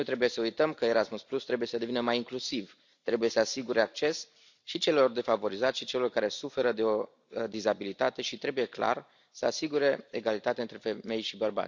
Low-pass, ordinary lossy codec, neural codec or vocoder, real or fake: 7.2 kHz; none; none; real